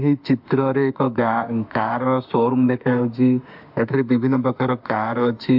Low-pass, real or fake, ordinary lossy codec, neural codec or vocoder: 5.4 kHz; fake; MP3, 48 kbps; autoencoder, 48 kHz, 32 numbers a frame, DAC-VAE, trained on Japanese speech